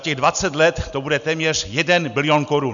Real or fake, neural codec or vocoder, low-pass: real; none; 7.2 kHz